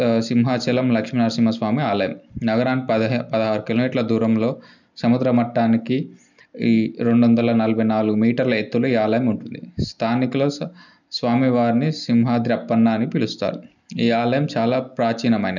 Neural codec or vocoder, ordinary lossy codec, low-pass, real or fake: none; none; 7.2 kHz; real